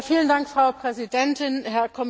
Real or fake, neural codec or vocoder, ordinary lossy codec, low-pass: real; none; none; none